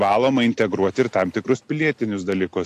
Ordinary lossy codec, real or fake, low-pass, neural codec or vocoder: AAC, 64 kbps; real; 14.4 kHz; none